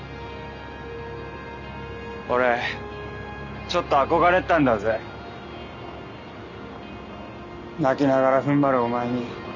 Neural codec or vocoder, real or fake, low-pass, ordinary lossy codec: none; real; 7.2 kHz; none